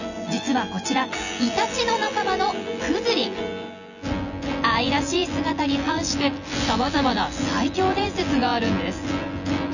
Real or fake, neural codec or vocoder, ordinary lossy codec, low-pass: fake; vocoder, 24 kHz, 100 mel bands, Vocos; none; 7.2 kHz